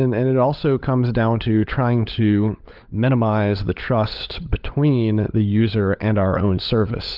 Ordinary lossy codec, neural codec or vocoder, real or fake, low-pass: Opus, 24 kbps; codec, 16 kHz, 16 kbps, FunCodec, trained on LibriTTS, 50 frames a second; fake; 5.4 kHz